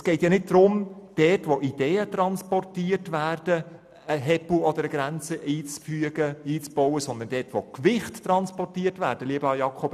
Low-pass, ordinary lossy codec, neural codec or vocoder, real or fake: 14.4 kHz; none; none; real